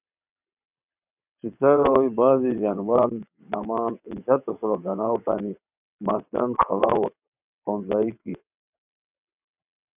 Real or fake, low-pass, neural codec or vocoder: fake; 3.6 kHz; vocoder, 22.05 kHz, 80 mel bands, WaveNeXt